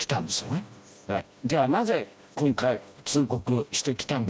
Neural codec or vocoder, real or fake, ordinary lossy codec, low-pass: codec, 16 kHz, 1 kbps, FreqCodec, smaller model; fake; none; none